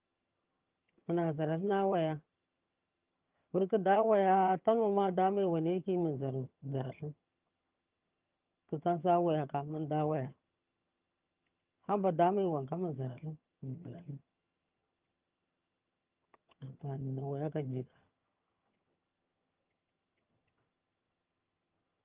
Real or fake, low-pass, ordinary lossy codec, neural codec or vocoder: fake; 3.6 kHz; Opus, 32 kbps; vocoder, 22.05 kHz, 80 mel bands, HiFi-GAN